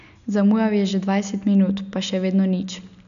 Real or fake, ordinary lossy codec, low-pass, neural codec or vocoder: real; none; 7.2 kHz; none